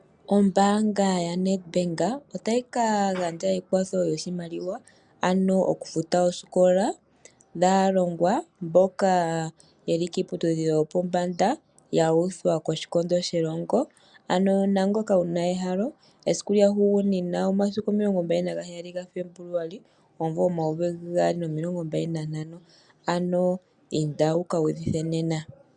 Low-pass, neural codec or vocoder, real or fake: 9.9 kHz; none; real